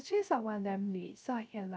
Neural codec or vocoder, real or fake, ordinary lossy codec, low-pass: codec, 16 kHz, 0.3 kbps, FocalCodec; fake; none; none